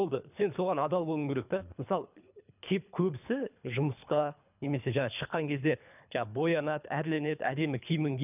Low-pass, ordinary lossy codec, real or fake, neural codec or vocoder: 3.6 kHz; none; fake; codec, 24 kHz, 3 kbps, HILCodec